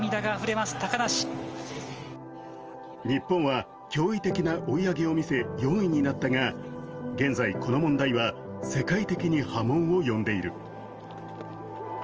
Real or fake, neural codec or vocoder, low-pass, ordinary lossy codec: real; none; 7.2 kHz; Opus, 24 kbps